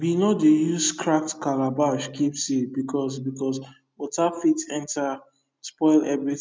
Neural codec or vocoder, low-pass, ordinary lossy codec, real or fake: none; none; none; real